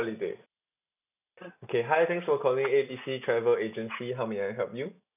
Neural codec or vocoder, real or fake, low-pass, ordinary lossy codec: none; real; 3.6 kHz; none